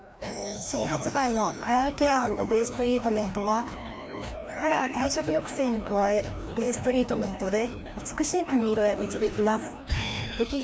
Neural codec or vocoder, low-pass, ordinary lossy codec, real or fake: codec, 16 kHz, 1 kbps, FreqCodec, larger model; none; none; fake